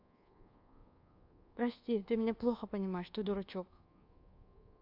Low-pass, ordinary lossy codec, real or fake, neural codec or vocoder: 5.4 kHz; AAC, 48 kbps; fake; codec, 24 kHz, 1.2 kbps, DualCodec